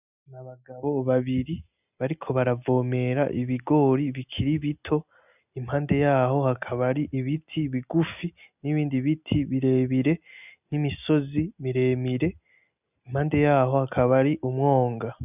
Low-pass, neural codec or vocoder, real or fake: 3.6 kHz; none; real